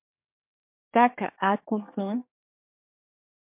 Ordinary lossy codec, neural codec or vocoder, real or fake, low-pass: MP3, 32 kbps; codec, 24 kHz, 1 kbps, SNAC; fake; 3.6 kHz